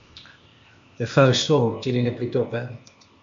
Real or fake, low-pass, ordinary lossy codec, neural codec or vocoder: fake; 7.2 kHz; MP3, 48 kbps; codec, 16 kHz, 0.8 kbps, ZipCodec